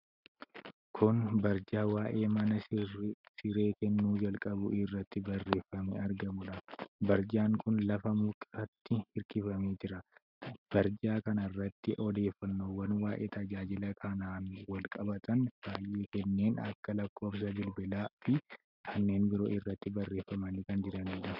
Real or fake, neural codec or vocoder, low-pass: real; none; 5.4 kHz